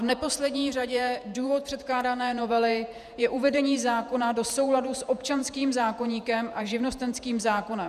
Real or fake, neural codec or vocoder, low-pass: fake; vocoder, 48 kHz, 128 mel bands, Vocos; 14.4 kHz